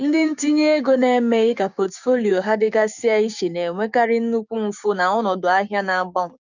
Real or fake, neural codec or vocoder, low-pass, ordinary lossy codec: fake; codec, 16 kHz, 6 kbps, DAC; 7.2 kHz; none